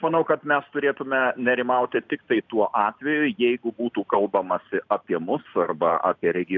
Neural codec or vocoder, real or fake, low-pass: none; real; 7.2 kHz